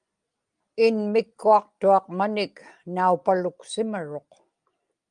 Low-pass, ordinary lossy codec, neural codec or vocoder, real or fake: 9.9 kHz; Opus, 32 kbps; none; real